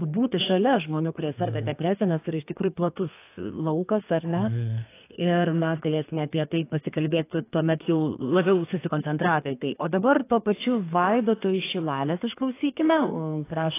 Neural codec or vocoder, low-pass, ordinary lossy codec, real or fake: codec, 32 kHz, 1.9 kbps, SNAC; 3.6 kHz; AAC, 24 kbps; fake